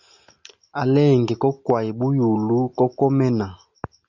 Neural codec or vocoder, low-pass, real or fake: none; 7.2 kHz; real